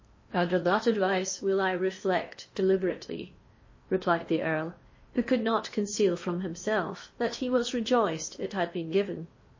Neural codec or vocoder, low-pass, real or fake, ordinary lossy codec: codec, 16 kHz in and 24 kHz out, 0.8 kbps, FocalCodec, streaming, 65536 codes; 7.2 kHz; fake; MP3, 32 kbps